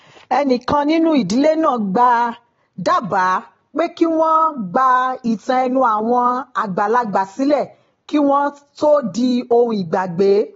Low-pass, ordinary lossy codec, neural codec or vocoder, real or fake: 7.2 kHz; AAC, 24 kbps; none; real